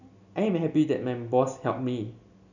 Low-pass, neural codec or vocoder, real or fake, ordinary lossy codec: 7.2 kHz; none; real; none